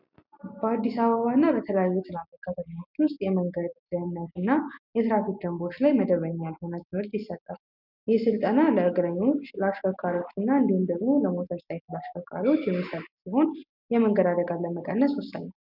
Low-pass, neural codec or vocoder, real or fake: 5.4 kHz; none; real